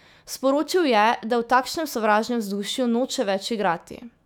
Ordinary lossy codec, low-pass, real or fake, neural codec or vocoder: none; 19.8 kHz; fake; autoencoder, 48 kHz, 128 numbers a frame, DAC-VAE, trained on Japanese speech